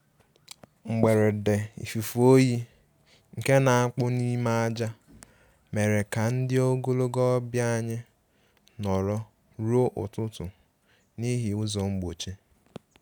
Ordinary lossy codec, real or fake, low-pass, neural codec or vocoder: none; real; none; none